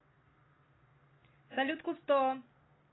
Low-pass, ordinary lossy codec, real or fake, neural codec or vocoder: 7.2 kHz; AAC, 16 kbps; real; none